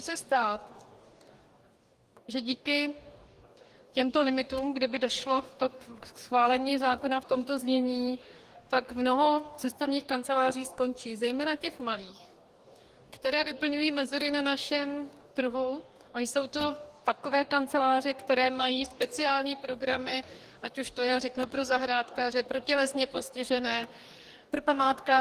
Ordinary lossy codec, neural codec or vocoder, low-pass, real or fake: Opus, 24 kbps; codec, 44.1 kHz, 2.6 kbps, DAC; 14.4 kHz; fake